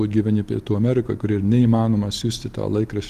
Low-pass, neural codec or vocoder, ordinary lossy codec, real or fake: 14.4 kHz; none; Opus, 24 kbps; real